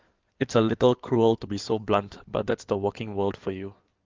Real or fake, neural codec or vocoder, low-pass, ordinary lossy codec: fake; codec, 16 kHz in and 24 kHz out, 2.2 kbps, FireRedTTS-2 codec; 7.2 kHz; Opus, 24 kbps